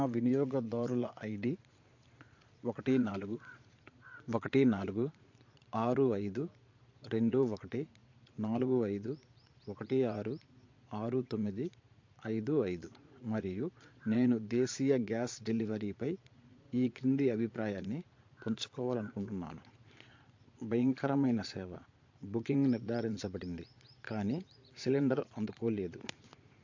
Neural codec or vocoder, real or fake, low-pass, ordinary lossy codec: vocoder, 22.05 kHz, 80 mel bands, Vocos; fake; 7.2 kHz; MP3, 48 kbps